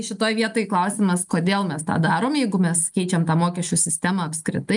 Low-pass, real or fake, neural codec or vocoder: 10.8 kHz; real; none